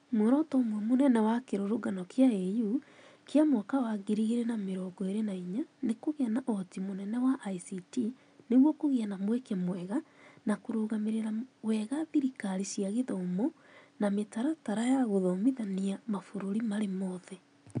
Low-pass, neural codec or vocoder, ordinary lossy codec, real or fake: 9.9 kHz; none; none; real